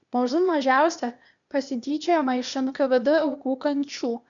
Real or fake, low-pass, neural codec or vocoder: fake; 7.2 kHz; codec, 16 kHz, 0.8 kbps, ZipCodec